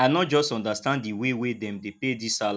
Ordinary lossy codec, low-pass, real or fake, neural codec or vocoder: none; none; real; none